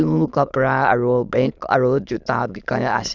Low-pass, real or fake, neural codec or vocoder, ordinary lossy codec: 7.2 kHz; fake; autoencoder, 22.05 kHz, a latent of 192 numbers a frame, VITS, trained on many speakers; Opus, 64 kbps